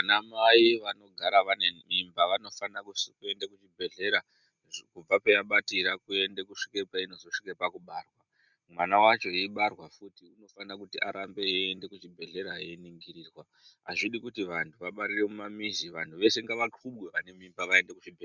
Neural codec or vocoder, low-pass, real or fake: none; 7.2 kHz; real